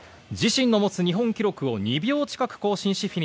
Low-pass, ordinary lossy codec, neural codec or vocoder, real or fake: none; none; none; real